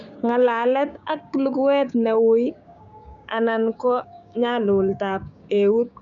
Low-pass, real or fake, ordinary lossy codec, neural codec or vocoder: 7.2 kHz; fake; none; codec, 16 kHz, 6 kbps, DAC